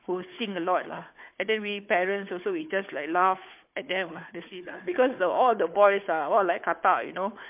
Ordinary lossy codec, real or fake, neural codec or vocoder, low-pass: MP3, 32 kbps; fake; codec, 16 kHz, 4 kbps, FunCodec, trained on Chinese and English, 50 frames a second; 3.6 kHz